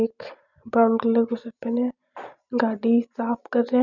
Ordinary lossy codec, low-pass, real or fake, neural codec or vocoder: none; 7.2 kHz; real; none